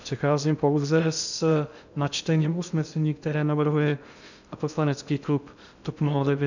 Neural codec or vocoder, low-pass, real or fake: codec, 16 kHz in and 24 kHz out, 0.6 kbps, FocalCodec, streaming, 2048 codes; 7.2 kHz; fake